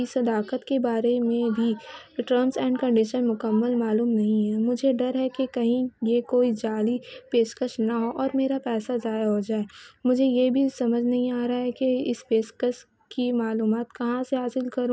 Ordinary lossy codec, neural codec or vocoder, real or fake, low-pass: none; none; real; none